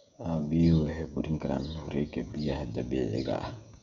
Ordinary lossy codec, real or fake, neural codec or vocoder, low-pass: none; fake; codec, 16 kHz, 16 kbps, FreqCodec, smaller model; 7.2 kHz